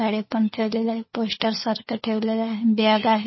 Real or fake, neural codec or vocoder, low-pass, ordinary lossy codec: fake; codec, 16 kHz, 4 kbps, FunCodec, trained on LibriTTS, 50 frames a second; 7.2 kHz; MP3, 24 kbps